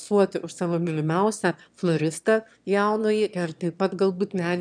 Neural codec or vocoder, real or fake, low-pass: autoencoder, 22.05 kHz, a latent of 192 numbers a frame, VITS, trained on one speaker; fake; 9.9 kHz